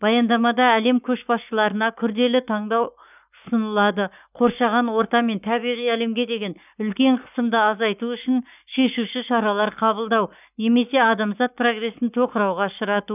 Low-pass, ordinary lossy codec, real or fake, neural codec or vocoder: 3.6 kHz; none; fake; codec, 44.1 kHz, 7.8 kbps, Pupu-Codec